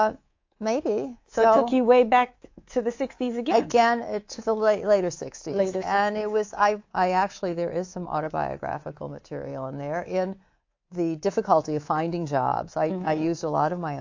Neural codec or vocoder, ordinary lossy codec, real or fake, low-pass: codec, 24 kHz, 3.1 kbps, DualCodec; AAC, 48 kbps; fake; 7.2 kHz